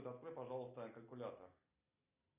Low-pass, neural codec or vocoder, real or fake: 3.6 kHz; none; real